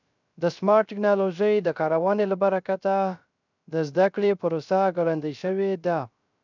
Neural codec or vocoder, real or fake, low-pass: codec, 16 kHz, 0.3 kbps, FocalCodec; fake; 7.2 kHz